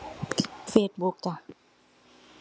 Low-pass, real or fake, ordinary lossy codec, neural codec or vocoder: none; real; none; none